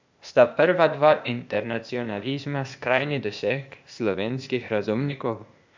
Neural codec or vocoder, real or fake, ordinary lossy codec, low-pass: codec, 16 kHz, 0.8 kbps, ZipCodec; fake; MP3, 64 kbps; 7.2 kHz